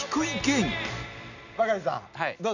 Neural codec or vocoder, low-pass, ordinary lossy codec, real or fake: none; 7.2 kHz; none; real